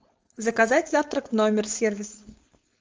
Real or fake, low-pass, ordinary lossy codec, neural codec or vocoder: fake; 7.2 kHz; Opus, 24 kbps; codec, 16 kHz, 4.8 kbps, FACodec